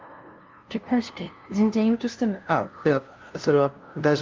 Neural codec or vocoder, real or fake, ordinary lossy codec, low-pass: codec, 16 kHz, 0.5 kbps, FunCodec, trained on LibriTTS, 25 frames a second; fake; Opus, 24 kbps; 7.2 kHz